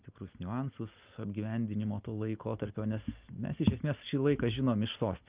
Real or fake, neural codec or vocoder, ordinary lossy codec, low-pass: real; none; Opus, 24 kbps; 3.6 kHz